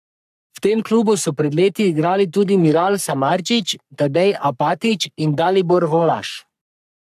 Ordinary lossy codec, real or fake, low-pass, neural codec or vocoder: AAC, 96 kbps; fake; 14.4 kHz; codec, 44.1 kHz, 3.4 kbps, Pupu-Codec